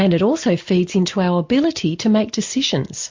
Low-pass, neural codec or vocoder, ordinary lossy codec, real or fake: 7.2 kHz; none; MP3, 48 kbps; real